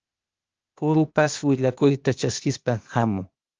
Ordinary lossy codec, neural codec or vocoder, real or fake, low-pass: Opus, 24 kbps; codec, 16 kHz, 0.8 kbps, ZipCodec; fake; 7.2 kHz